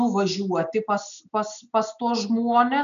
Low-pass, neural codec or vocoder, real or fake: 7.2 kHz; none; real